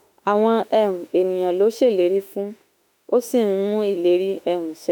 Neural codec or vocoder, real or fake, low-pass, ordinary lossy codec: autoencoder, 48 kHz, 32 numbers a frame, DAC-VAE, trained on Japanese speech; fake; none; none